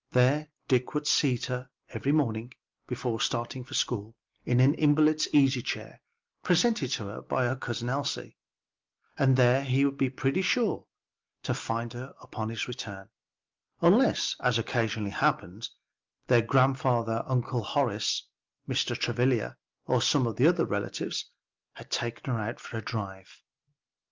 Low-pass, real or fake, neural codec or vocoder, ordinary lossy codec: 7.2 kHz; real; none; Opus, 32 kbps